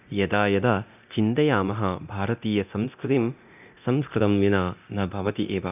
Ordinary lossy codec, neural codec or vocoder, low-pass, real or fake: none; codec, 24 kHz, 0.9 kbps, DualCodec; 3.6 kHz; fake